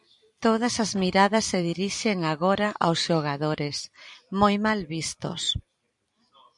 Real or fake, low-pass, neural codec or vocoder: fake; 10.8 kHz; vocoder, 44.1 kHz, 128 mel bands every 256 samples, BigVGAN v2